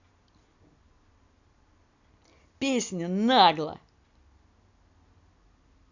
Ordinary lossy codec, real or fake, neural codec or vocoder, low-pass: none; real; none; 7.2 kHz